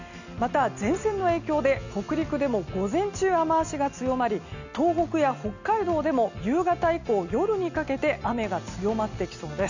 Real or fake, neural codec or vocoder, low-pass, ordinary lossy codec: real; none; 7.2 kHz; none